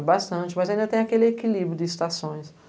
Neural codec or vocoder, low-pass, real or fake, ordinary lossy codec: none; none; real; none